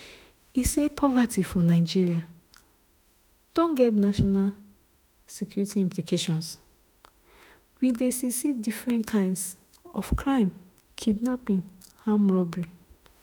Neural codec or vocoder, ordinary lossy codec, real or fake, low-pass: autoencoder, 48 kHz, 32 numbers a frame, DAC-VAE, trained on Japanese speech; none; fake; none